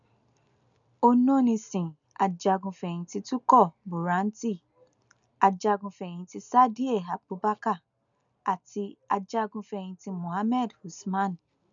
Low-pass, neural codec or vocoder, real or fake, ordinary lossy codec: 7.2 kHz; none; real; none